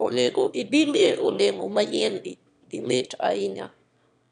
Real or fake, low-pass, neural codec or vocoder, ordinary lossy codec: fake; 9.9 kHz; autoencoder, 22.05 kHz, a latent of 192 numbers a frame, VITS, trained on one speaker; none